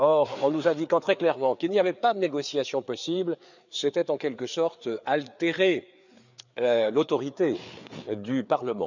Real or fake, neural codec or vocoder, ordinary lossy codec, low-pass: fake; codec, 16 kHz, 4 kbps, FreqCodec, larger model; none; 7.2 kHz